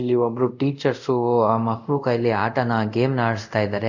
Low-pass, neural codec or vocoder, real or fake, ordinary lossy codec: 7.2 kHz; codec, 24 kHz, 0.5 kbps, DualCodec; fake; none